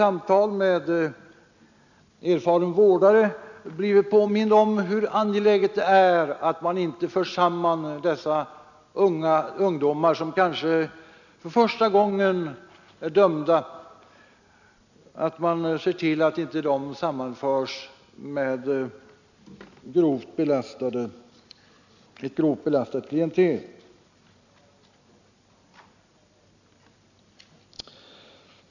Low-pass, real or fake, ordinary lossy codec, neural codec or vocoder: 7.2 kHz; real; none; none